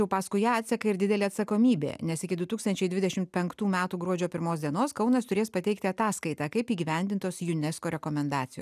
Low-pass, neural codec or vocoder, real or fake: 14.4 kHz; none; real